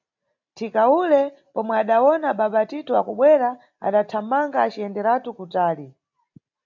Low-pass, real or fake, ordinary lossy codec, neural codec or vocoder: 7.2 kHz; real; AAC, 48 kbps; none